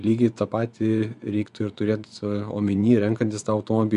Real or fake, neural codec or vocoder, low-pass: fake; vocoder, 24 kHz, 100 mel bands, Vocos; 10.8 kHz